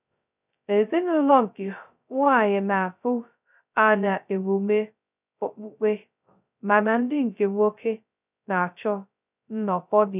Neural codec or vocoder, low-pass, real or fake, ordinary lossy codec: codec, 16 kHz, 0.2 kbps, FocalCodec; 3.6 kHz; fake; none